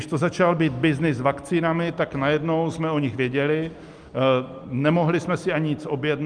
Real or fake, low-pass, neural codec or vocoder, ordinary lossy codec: real; 9.9 kHz; none; Opus, 32 kbps